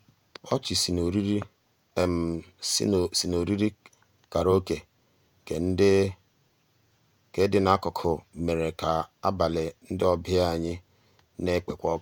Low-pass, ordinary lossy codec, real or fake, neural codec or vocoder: none; none; fake; vocoder, 48 kHz, 128 mel bands, Vocos